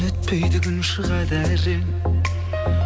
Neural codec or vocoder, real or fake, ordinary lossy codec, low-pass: none; real; none; none